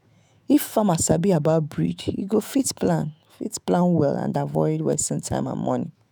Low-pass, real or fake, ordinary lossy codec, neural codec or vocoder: none; fake; none; autoencoder, 48 kHz, 128 numbers a frame, DAC-VAE, trained on Japanese speech